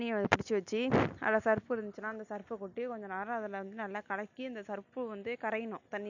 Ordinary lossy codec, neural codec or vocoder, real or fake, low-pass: none; none; real; 7.2 kHz